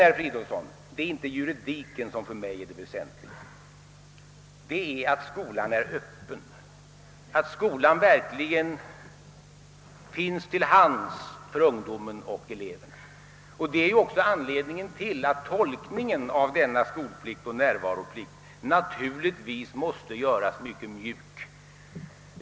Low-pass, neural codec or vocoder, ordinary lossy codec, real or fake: none; none; none; real